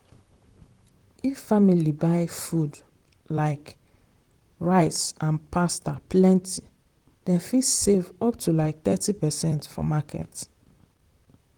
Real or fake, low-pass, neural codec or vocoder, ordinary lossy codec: fake; 19.8 kHz; vocoder, 44.1 kHz, 128 mel bands, Pupu-Vocoder; Opus, 24 kbps